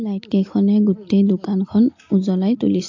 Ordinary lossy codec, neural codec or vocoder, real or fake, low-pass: AAC, 48 kbps; none; real; 7.2 kHz